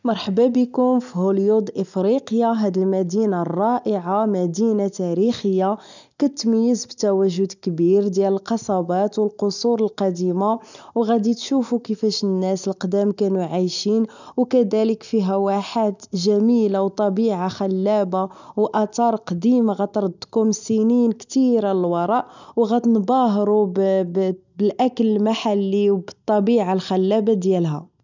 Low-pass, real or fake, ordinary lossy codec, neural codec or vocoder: 7.2 kHz; real; none; none